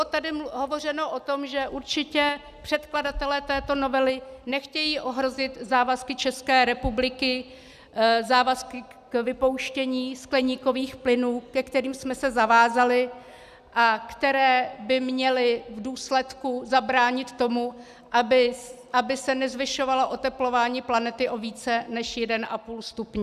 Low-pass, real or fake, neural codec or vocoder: 14.4 kHz; real; none